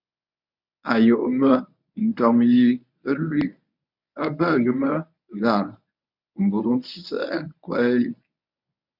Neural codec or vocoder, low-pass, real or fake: codec, 24 kHz, 0.9 kbps, WavTokenizer, medium speech release version 1; 5.4 kHz; fake